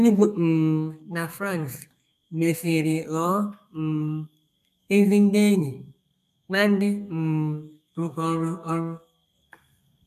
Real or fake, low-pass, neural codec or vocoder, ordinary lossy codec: fake; 14.4 kHz; codec, 32 kHz, 1.9 kbps, SNAC; none